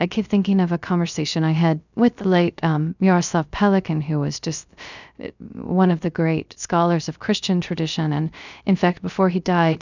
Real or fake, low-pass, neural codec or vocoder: fake; 7.2 kHz; codec, 16 kHz, 0.3 kbps, FocalCodec